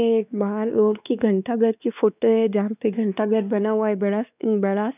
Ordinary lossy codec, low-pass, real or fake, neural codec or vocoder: none; 3.6 kHz; fake; codec, 16 kHz, 2 kbps, X-Codec, WavLM features, trained on Multilingual LibriSpeech